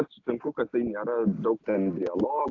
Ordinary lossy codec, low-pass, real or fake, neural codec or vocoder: AAC, 48 kbps; 7.2 kHz; real; none